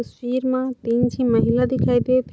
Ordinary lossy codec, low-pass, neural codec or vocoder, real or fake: none; none; none; real